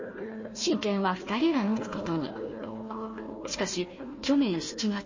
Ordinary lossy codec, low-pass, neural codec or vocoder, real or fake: MP3, 32 kbps; 7.2 kHz; codec, 16 kHz, 1 kbps, FunCodec, trained on Chinese and English, 50 frames a second; fake